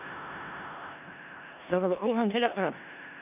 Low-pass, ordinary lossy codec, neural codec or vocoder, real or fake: 3.6 kHz; none; codec, 16 kHz in and 24 kHz out, 0.4 kbps, LongCat-Audio-Codec, four codebook decoder; fake